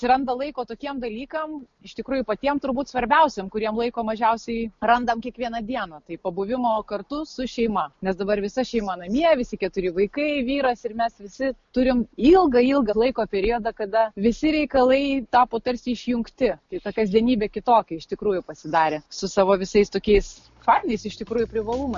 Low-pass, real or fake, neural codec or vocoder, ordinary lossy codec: 7.2 kHz; real; none; MP3, 48 kbps